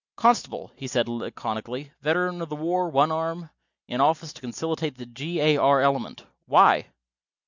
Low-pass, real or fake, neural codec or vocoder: 7.2 kHz; real; none